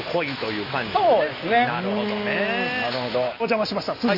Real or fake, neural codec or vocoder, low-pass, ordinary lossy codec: real; none; 5.4 kHz; none